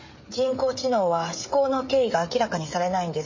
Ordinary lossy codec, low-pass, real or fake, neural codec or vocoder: MP3, 32 kbps; 7.2 kHz; fake; codec, 16 kHz, 16 kbps, FreqCodec, smaller model